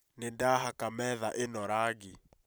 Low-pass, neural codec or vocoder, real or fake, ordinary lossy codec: none; vocoder, 44.1 kHz, 128 mel bands every 512 samples, BigVGAN v2; fake; none